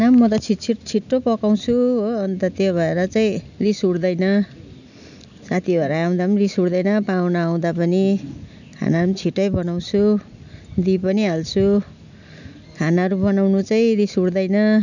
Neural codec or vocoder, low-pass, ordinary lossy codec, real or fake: none; 7.2 kHz; none; real